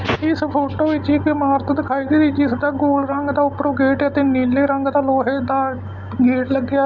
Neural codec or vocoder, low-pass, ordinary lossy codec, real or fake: none; 7.2 kHz; none; real